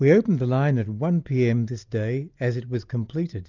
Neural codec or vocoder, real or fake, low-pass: none; real; 7.2 kHz